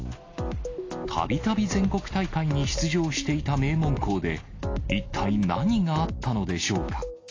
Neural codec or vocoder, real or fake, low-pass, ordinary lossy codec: none; real; 7.2 kHz; AAC, 32 kbps